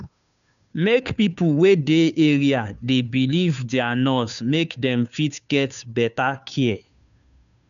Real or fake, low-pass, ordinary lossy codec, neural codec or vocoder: fake; 7.2 kHz; none; codec, 16 kHz, 2 kbps, FunCodec, trained on Chinese and English, 25 frames a second